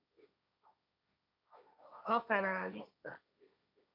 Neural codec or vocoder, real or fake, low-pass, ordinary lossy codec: codec, 16 kHz, 1.1 kbps, Voila-Tokenizer; fake; 5.4 kHz; MP3, 48 kbps